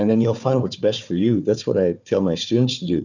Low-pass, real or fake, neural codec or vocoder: 7.2 kHz; fake; codec, 16 kHz, 4 kbps, FunCodec, trained on Chinese and English, 50 frames a second